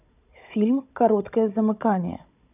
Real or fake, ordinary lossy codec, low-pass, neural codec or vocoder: fake; none; 3.6 kHz; codec, 16 kHz, 16 kbps, FunCodec, trained on Chinese and English, 50 frames a second